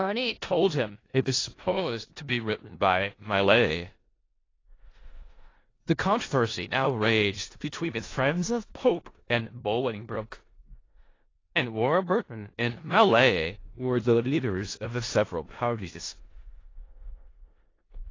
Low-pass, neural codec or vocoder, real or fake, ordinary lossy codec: 7.2 kHz; codec, 16 kHz in and 24 kHz out, 0.4 kbps, LongCat-Audio-Codec, four codebook decoder; fake; AAC, 32 kbps